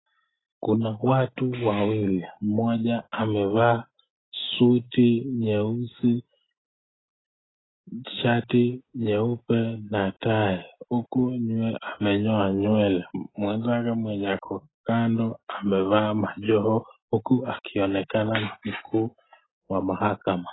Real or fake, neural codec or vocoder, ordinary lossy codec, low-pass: real; none; AAC, 16 kbps; 7.2 kHz